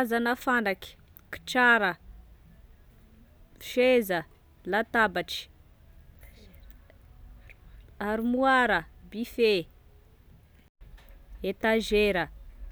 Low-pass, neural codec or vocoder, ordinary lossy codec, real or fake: none; none; none; real